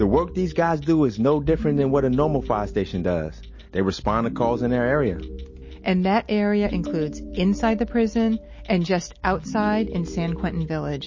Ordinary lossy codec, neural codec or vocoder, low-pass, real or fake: MP3, 32 kbps; none; 7.2 kHz; real